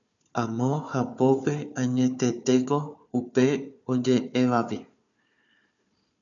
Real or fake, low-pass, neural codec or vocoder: fake; 7.2 kHz; codec, 16 kHz, 4 kbps, FunCodec, trained on Chinese and English, 50 frames a second